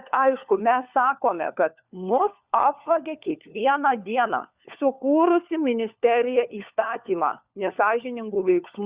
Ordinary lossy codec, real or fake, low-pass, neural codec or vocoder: Opus, 64 kbps; fake; 3.6 kHz; codec, 16 kHz, 4 kbps, FunCodec, trained on LibriTTS, 50 frames a second